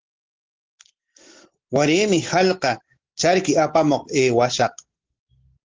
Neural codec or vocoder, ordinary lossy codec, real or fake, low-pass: none; Opus, 16 kbps; real; 7.2 kHz